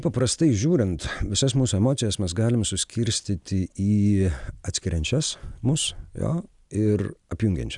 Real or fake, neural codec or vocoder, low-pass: real; none; 10.8 kHz